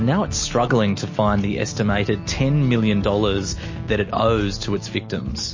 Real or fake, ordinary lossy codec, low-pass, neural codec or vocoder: real; MP3, 32 kbps; 7.2 kHz; none